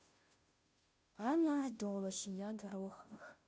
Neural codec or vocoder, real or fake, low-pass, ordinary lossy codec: codec, 16 kHz, 0.5 kbps, FunCodec, trained on Chinese and English, 25 frames a second; fake; none; none